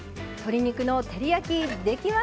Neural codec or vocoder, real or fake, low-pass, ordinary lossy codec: none; real; none; none